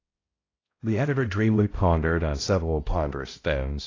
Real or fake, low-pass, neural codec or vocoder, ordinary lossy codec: fake; 7.2 kHz; codec, 16 kHz, 0.5 kbps, X-Codec, HuBERT features, trained on balanced general audio; AAC, 32 kbps